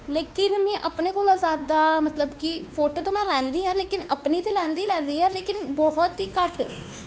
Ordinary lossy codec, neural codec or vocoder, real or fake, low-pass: none; codec, 16 kHz, 2 kbps, X-Codec, WavLM features, trained on Multilingual LibriSpeech; fake; none